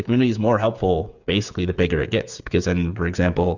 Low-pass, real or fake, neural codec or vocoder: 7.2 kHz; fake; codec, 16 kHz, 8 kbps, FreqCodec, smaller model